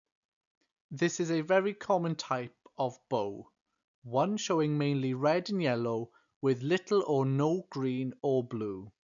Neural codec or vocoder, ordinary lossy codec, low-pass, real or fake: none; none; 7.2 kHz; real